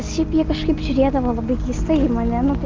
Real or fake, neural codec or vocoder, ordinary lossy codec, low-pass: real; none; Opus, 32 kbps; 7.2 kHz